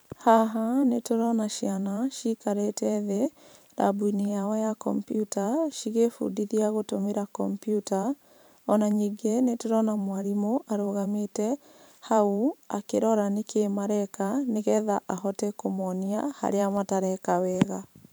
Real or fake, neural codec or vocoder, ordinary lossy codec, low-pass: fake; vocoder, 44.1 kHz, 128 mel bands every 512 samples, BigVGAN v2; none; none